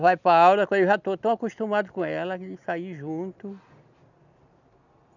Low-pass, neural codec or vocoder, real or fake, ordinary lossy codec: 7.2 kHz; none; real; none